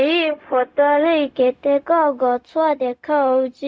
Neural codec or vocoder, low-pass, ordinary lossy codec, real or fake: codec, 16 kHz, 0.4 kbps, LongCat-Audio-Codec; none; none; fake